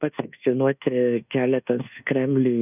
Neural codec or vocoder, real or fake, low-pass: codec, 24 kHz, 1.2 kbps, DualCodec; fake; 3.6 kHz